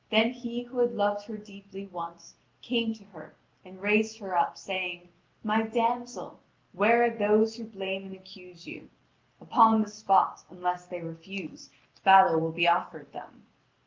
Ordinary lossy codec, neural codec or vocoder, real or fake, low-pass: Opus, 32 kbps; none; real; 7.2 kHz